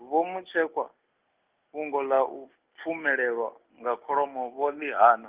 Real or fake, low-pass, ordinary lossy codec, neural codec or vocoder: real; 3.6 kHz; Opus, 32 kbps; none